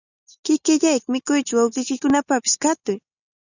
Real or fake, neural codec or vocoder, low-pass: real; none; 7.2 kHz